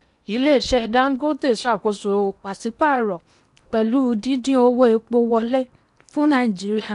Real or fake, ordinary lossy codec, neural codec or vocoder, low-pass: fake; none; codec, 16 kHz in and 24 kHz out, 0.8 kbps, FocalCodec, streaming, 65536 codes; 10.8 kHz